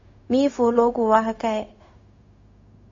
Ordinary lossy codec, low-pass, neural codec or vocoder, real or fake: MP3, 32 kbps; 7.2 kHz; codec, 16 kHz, 0.4 kbps, LongCat-Audio-Codec; fake